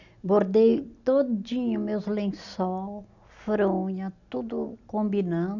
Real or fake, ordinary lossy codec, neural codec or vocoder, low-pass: real; none; none; 7.2 kHz